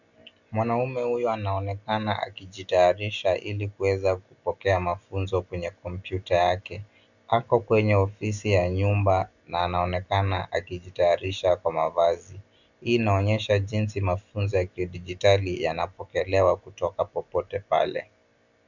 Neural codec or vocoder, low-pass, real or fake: none; 7.2 kHz; real